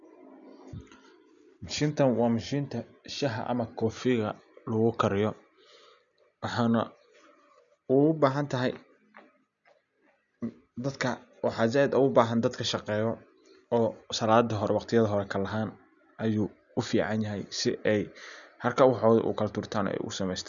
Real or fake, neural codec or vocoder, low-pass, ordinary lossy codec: real; none; 7.2 kHz; none